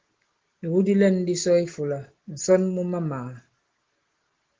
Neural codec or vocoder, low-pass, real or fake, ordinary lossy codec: none; 7.2 kHz; real; Opus, 16 kbps